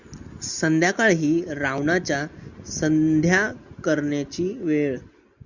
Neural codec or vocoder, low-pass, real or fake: none; 7.2 kHz; real